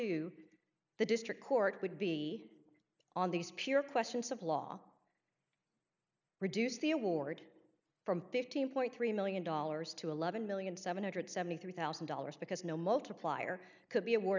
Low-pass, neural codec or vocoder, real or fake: 7.2 kHz; none; real